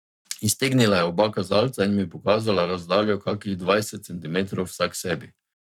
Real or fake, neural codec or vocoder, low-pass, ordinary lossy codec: fake; codec, 44.1 kHz, 7.8 kbps, Pupu-Codec; 19.8 kHz; none